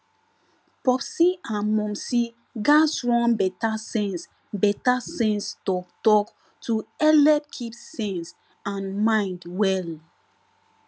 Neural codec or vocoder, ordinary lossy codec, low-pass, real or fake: none; none; none; real